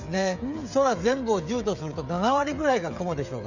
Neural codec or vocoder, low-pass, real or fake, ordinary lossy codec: codec, 16 kHz, 16 kbps, FreqCodec, smaller model; 7.2 kHz; fake; none